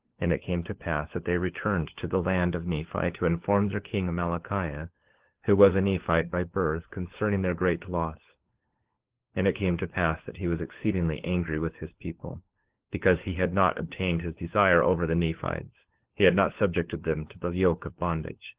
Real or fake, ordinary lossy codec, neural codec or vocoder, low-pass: fake; Opus, 16 kbps; codec, 16 kHz, 4 kbps, FunCodec, trained on LibriTTS, 50 frames a second; 3.6 kHz